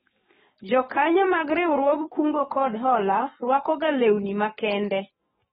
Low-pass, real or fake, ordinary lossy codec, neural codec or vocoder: 19.8 kHz; fake; AAC, 16 kbps; codec, 44.1 kHz, 7.8 kbps, DAC